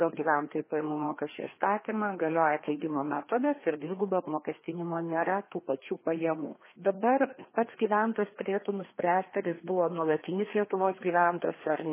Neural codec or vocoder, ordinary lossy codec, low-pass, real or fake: codec, 16 kHz, 2 kbps, FreqCodec, larger model; MP3, 16 kbps; 3.6 kHz; fake